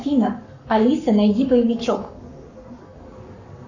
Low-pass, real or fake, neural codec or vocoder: 7.2 kHz; fake; codec, 44.1 kHz, 7.8 kbps, Pupu-Codec